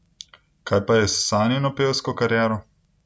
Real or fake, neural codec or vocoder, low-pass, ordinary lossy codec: real; none; none; none